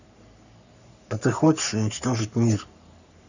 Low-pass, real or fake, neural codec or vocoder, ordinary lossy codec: 7.2 kHz; fake; codec, 44.1 kHz, 3.4 kbps, Pupu-Codec; none